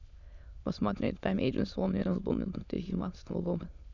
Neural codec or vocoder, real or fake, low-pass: autoencoder, 22.05 kHz, a latent of 192 numbers a frame, VITS, trained on many speakers; fake; 7.2 kHz